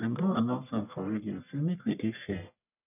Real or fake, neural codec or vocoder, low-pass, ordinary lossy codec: fake; codec, 44.1 kHz, 1.7 kbps, Pupu-Codec; 3.6 kHz; none